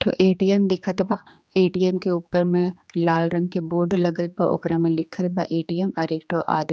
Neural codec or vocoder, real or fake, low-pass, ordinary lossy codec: codec, 16 kHz, 2 kbps, X-Codec, HuBERT features, trained on general audio; fake; none; none